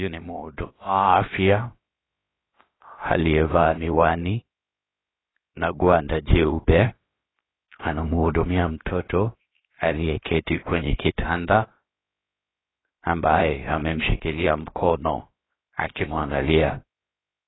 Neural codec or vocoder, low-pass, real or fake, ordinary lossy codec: codec, 16 kHz, about 1 kbps, DyCAST, with the encoder's durations; 7.2 kHz; fake; AAC, 16 kbps